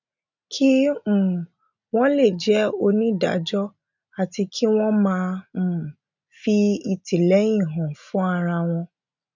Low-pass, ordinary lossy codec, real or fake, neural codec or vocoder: 7.2 kHz; none; real; none